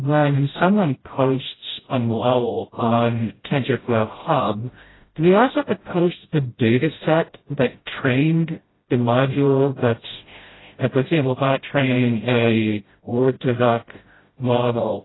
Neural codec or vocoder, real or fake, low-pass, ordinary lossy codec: codec, 16 kHz, 0.5 kbps, FreqCodec, smaller model; fake; 7.2 kHz; AAC, 16 kbps